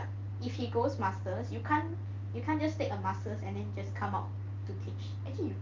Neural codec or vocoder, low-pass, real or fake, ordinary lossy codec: none; 7.2 kHz; real; Opus, 24 kbps